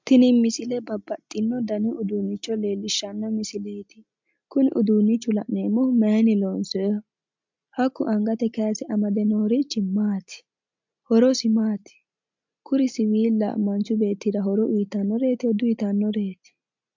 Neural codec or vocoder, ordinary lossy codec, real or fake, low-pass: none; MP3, 64 kbps; real; 7.2 kHz